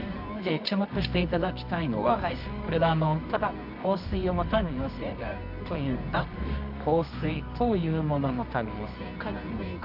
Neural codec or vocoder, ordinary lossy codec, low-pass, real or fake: codec, 24 kHz, 0.9 kbps, WavTokenizer, medium music audio release; none; 5.4 kHz; fake